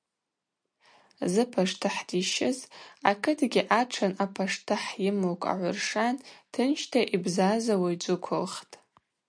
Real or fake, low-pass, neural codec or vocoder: real; 9.9 kHz; none